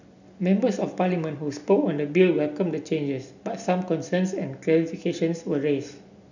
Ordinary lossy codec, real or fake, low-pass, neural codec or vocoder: none; real; 7.2 kHz; none